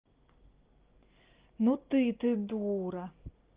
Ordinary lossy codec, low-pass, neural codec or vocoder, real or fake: Opus, 32 kbps; 3.6 kHz; none; real